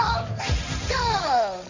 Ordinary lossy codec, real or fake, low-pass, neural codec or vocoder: none; fake; 7.2 kHz; codec, 16 kHz, 1.1 kbps, Voila-Tokenizer